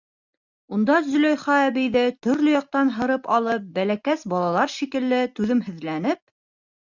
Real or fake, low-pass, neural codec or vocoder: real; 7.2 kHz; none